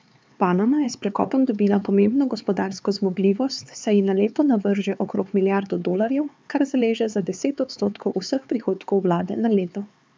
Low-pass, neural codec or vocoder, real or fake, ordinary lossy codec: none; codec, 16 kHz, 4 kbps, X-Codec, HuBERT features, trained on LibriSpeech; fake; none